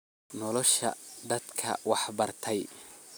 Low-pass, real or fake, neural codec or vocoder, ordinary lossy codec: none; real; none; none